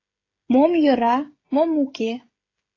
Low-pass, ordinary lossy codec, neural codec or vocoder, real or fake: 7.2 kHz; AAC, 32 kbps; codec, 16 kHz, 16 kbps, FreqCodec, smaller model; fake